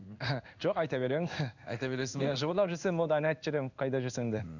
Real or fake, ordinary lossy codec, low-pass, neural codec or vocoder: fake; none; 7.2 kHz; codec, 16 kHz in and 24 kHz out, 1 kbps, XY-Tokenizer